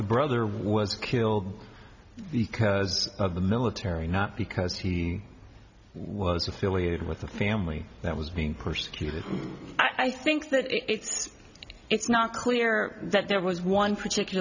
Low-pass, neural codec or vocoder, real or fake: 7.2 kHz; none; real